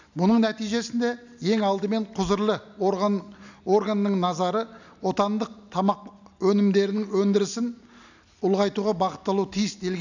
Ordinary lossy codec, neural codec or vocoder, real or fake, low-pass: none; none; real; 7.2 kHz